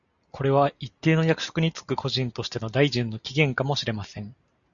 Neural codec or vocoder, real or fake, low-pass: none; real; 7.2 kHz